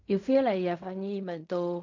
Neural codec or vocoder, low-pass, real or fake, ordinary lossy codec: codec, 16 kHz in and 24 kHz out, 0.4 kbps, LongCat-Audio-Codec, fine tuned four codebook decoder; 7.2 kHz; fake; MP3, 48 kbps